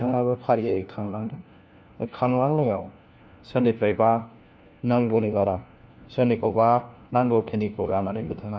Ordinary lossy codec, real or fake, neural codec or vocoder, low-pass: none; fake; codec, 16 kHz, 1 kbps, FunCodec, trained on LibriTTS, 50 frames a second; none